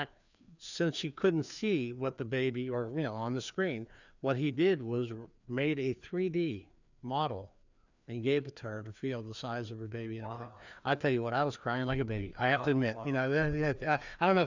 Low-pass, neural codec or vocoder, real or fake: 7.2 kHz; codec, 16 kHz, 2 kbps, FreqCodec, larger model; fake